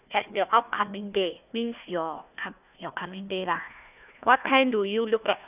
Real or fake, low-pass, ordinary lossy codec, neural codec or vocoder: fake; 3.6 kHz; none; codec, 16 kHz, 1 kbps, FunCodec, trained on Chinese and English, 50 frames a second